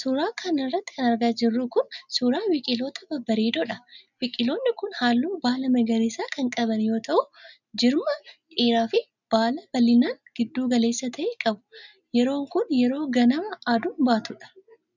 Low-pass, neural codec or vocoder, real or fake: 7.2 kHz; none; real